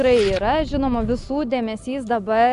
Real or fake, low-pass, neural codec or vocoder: real; 10.8 kHz; none